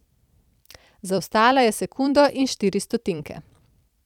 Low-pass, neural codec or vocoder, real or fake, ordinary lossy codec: 19.8 kHz; vocoder, 44.1 kHz, 128 mel bands every 256 samples, BigVGAN v2; fake; none